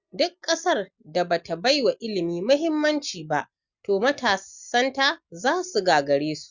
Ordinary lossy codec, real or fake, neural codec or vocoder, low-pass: Opus, 64 kbps; real; none; 7.2 kHz